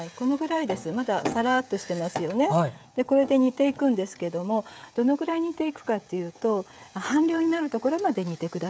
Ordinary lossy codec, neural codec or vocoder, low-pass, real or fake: none; codec, 16 kHz, 16 kbps, FreqCodec, smaller model; none; fake